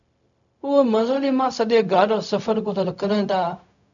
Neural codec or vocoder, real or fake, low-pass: codec, 16 kHz, 0.4 kbps, LongCat-Audio-Codec; fake; 7.2 kHz